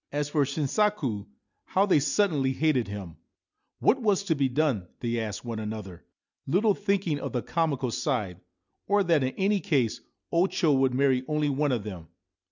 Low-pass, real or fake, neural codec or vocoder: 7.2 kHz; real; none